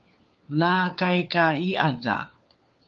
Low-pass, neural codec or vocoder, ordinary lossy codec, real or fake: 7.2 kHz; codec, 16 kHz, 4 kbps, FunCodec, trained on LibriTTS, 50 frames a second; Opus, 32 kbps; fake